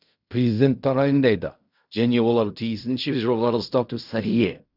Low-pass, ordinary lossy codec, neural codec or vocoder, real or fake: 5.4 kHz; none; codec, 16 kHz in and 24 kHz out, 0.4 kbps, LongCat-Audio-Codec, fine tuned four codebook decoder; fake